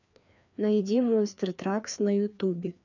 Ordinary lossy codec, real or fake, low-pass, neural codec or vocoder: none; fake; 7.2 kHz; codec, 16 kHz, 2 kbps, FreqCodec, larger model